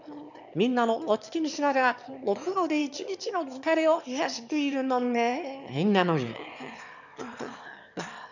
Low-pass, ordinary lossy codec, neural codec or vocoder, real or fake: 7.2 kHz; none; autoencoder, 22.05 kHz, a latent of 192 numbers a frame, VITS, trained on one speaker; fake